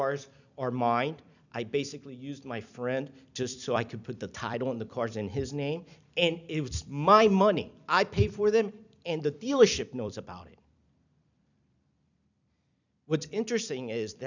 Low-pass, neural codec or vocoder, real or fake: 7.2 kHz; vocoder, 44.1 kHz, 128 mel bands every 256 samples, BigVGAN v2; fake